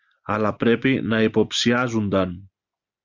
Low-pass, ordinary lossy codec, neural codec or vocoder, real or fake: 7.2 kHz; Opus, 64 kbps; none; real